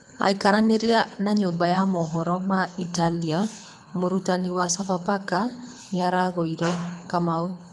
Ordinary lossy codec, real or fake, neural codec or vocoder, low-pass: none; fake; codec, 24 kHz, 3 kbps, HILCodec; none